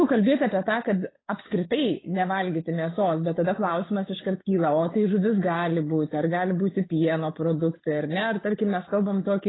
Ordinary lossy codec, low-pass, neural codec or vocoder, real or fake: AAC, 16 kbps; 7.2 kHz; codec, 16 kHz, 8 kbps, FunCodec, trained on Chinese and English, 25 frames a second; fake